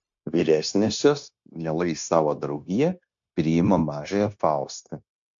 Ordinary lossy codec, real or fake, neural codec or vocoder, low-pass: AAC, 64 kbps; fake; codec, 16 kHz, 0.9 kbps, LongCat-Audio-Codec; 7.2 kHz